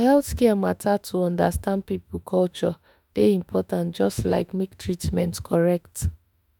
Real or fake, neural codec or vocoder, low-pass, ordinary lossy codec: fake; autoencoder, 48 kHz, 32 numbers a frame, DAC-VAE, trained on Japanese speech; 19.8 kHz; none